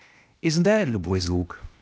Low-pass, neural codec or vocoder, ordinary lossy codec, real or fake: none; codec, 16 kHz, 0.8 kbps, ZipCodec; none; fake